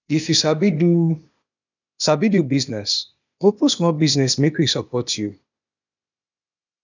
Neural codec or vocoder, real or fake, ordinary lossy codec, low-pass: codec, 16 kHz, 0.8 kbps, ZipCodec; fake; none; 7.2 kHz